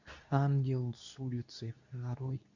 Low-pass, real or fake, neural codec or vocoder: 7.2 kHz; fake; codec, 24 kHz, 0.9 kbps, WavTokenizer, medium speech release version 2